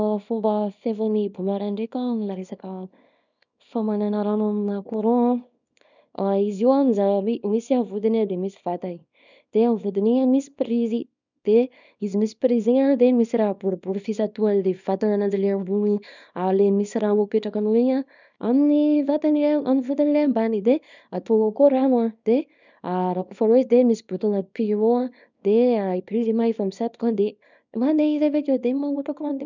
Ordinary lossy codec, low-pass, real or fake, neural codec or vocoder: none; 7.2 kHz; fake; codec, 24 kHz, 0.9 kbps, WavTokenizer, small release